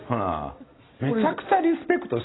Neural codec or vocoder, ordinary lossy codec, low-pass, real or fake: none; AAC, 16 kbps; 7.2 kHz; real